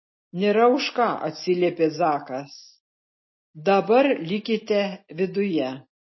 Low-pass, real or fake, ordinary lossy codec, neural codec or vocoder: 7.2 kHz; real; MP3, 24 kbps; none